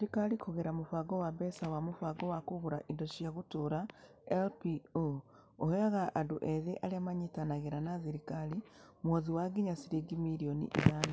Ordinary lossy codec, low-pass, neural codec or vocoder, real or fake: none; none; none; real